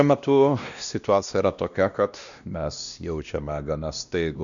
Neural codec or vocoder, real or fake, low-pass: codec, 16 kHz, 1 kbps, X-Codec, WavLM features, trained on Multilingual LibriSpeech; fake; 7.2 kHz